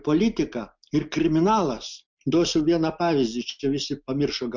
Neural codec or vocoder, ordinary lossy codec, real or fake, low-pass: none; MP3, 64 kbps; real; 7.2 kHz